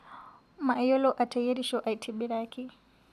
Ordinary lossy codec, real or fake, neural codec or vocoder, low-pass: none; real; none; 14.4 kHz